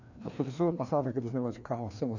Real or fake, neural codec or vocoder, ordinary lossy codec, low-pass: fake; codec, 16 kHz, 2 kbps, FreqCodec, larger model; none; 7.2 kHz